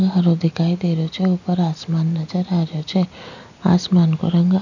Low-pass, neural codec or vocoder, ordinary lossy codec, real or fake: 7.2 kHz; none; none; real